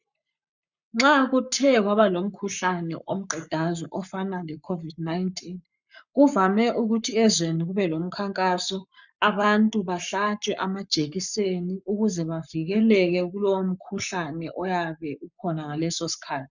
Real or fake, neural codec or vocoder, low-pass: fake; codec, 44.1 kHz, 7.8 kbps, Pupu-Codec; 7.2 kHz